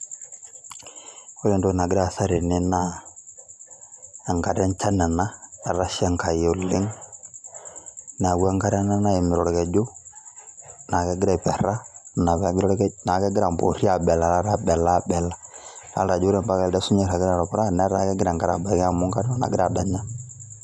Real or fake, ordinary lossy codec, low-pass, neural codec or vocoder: real; none; none; none